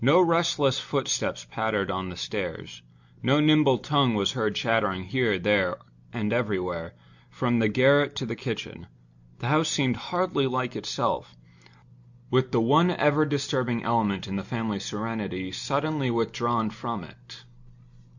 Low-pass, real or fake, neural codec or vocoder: 7.2 kHz; real; none